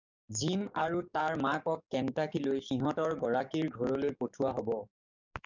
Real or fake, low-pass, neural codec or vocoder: fake; 7.2 kHz; codec, 44.1 kHz, 7.8 kbps, DAC